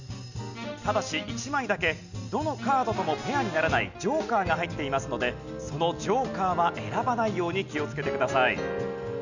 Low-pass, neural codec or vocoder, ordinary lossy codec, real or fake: 7.2 kHz; none; none; real